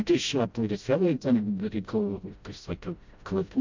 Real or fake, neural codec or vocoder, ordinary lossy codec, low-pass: fake; codec, 16 kHz, 0.5 kbps, FreqCodec, smaller model; MP3, 64 kbps; 7.2 kHz